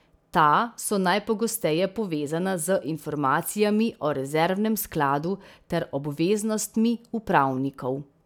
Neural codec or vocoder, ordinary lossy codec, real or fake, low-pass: none; none; real; 19.8 kHz